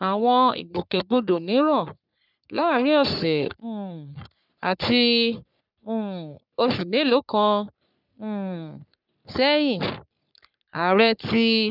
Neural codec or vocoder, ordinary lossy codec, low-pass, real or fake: codec, 44.1 kHz, 3.4 kbps, Pupu-Codec; none; 5.4 kHz; fake